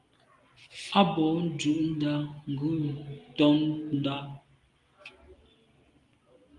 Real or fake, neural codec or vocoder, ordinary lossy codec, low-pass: real; none; Opus, 32 kbps; 10.8 kHz